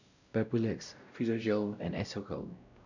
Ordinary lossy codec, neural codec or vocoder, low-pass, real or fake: none; codec, 16 kHz, 0.5 kbps, X-Codec, WavLM features, trained on Multilingual LibriSpeech; 7.2 kHz; fake